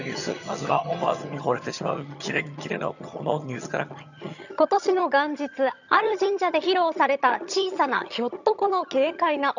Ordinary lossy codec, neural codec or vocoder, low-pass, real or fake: none; vocoder, 22.05 kHz, 80 mel bands, HiFi-GAN; 7.2 kHz; fake